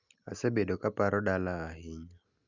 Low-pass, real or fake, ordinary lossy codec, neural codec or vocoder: 7.2 kHz; real; Opus, 64 kbps; none